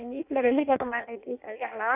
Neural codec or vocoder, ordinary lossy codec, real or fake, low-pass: codec, 16 kHz in and 24 kHz out, 0.6 kbps, FireRedTTS-2 codec; none; fake; 3.6 kHz